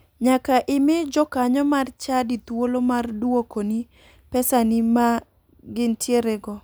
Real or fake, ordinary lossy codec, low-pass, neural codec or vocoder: real; none; none; none